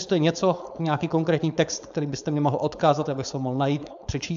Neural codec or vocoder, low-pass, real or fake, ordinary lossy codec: codec, 16 kHz, 4.8 kbps, FACodec; 7.2 kHz; fake; AAC, 96 kbps